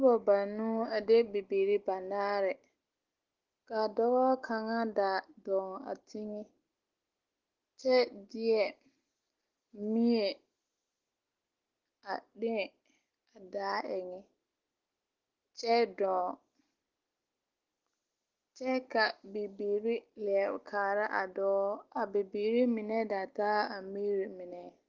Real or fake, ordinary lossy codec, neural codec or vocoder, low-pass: real; Opus, 16 kbps; none; 7.2 kHz